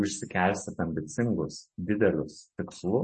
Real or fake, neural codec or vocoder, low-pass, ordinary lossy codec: real; none; 10.8 kHz; MP3, 32 kbps